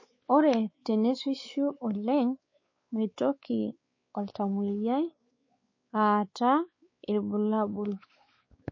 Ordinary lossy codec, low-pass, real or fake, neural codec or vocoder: MP3, 32 kbps; 7.2 kHz; fake; codec, 16 kHz, 4 kbps, X-Codec, WavLM features, trained on Multilingual LibriSpeech